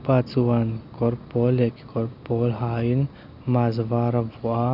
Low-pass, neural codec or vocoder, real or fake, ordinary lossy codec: 5.4 kHz; none; real; none